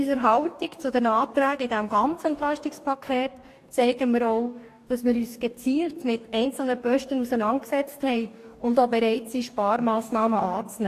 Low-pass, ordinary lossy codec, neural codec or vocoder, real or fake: 14.4 kHz; AAC, 64 kbps; codec, 44.1 kHz, 2.6 kbps, DAC; fake